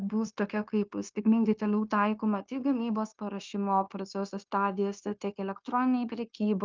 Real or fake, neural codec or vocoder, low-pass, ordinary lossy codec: fake; codec, 16 kHz, 0.9 kbps, LongCat-Audio-Codec; 7.2 kHz; Opus, 24 kbps